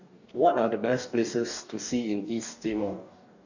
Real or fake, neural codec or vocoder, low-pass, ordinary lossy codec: fake; codec, 44.1 kHz, 2.6 kbps, DAC; 7.2 kHz; none